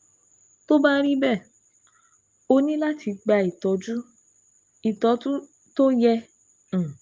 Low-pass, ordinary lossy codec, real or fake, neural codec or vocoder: 7.2 kHz; Opus, 32 kbps; real; none